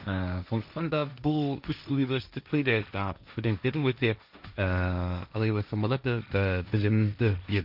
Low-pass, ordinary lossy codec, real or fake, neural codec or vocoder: 5.4 kHz; none; fake; codec, 16 kHz, 1.1 kbps, Voila-Tokenizer